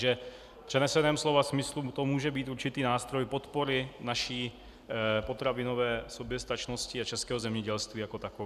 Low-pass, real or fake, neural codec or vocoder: 14.4 kHz; real; none